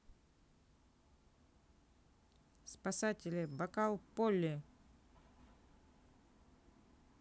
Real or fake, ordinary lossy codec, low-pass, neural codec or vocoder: real; none; none; none